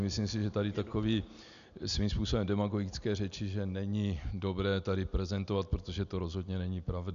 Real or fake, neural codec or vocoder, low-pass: real; none; 7.2 kHz